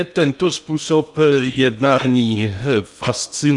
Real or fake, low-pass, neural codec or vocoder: fake; 10.8 kHz; codec, 16 kHz in and 24 kHz out, 0.8 kbps, FocalCodec, streaming, 65536 codes